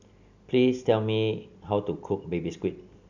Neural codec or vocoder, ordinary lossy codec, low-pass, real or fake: none; none; 7.2 kHz; real